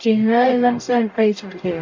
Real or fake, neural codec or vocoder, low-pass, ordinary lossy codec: fake; codec, 44.1 kHz, 0.9 kbps, DAC; 7.2 kHz; MP3, 64 kbps